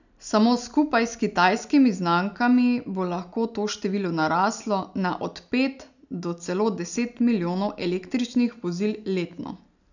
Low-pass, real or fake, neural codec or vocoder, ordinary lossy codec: 7.2 kHz; real; none; none